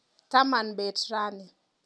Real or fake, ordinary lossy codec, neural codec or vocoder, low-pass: real; none; none; none